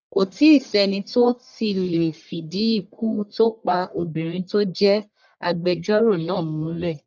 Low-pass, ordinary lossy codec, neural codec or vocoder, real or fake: 7.2 kHz; none; codec, 44.1 kHz, 1.7 kbps, Pupu-Codec; fake